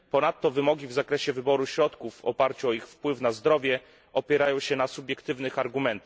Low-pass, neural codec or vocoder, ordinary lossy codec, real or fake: none; none; none; real